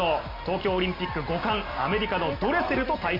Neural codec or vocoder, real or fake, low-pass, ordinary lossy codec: none; real; 5.4 kHz; none